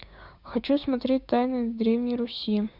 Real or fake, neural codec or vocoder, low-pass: fake; codec, 16 kHz, 6 kbps, DAC; 5.4 kHz